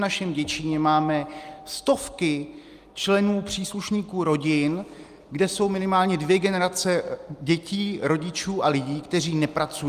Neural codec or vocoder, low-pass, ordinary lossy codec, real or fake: none; 14.4 kHz; Opus, 32 kbps; real